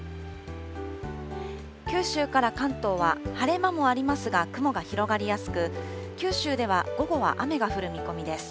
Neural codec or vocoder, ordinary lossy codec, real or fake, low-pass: none; none; real; none